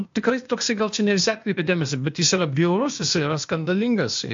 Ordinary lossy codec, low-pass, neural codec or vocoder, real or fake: MP3, 48 kbps; 7.2 kHz; codec, 16 kHz, 0.8 kbps, ZipCodec; fake